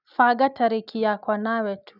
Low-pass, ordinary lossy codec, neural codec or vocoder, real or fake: 5.4 kHz; none; none; real